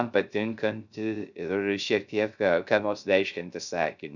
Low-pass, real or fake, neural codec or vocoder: 7.2 kHz; fake; codec, 16 kHz, 0.3 kbps, FocalCodec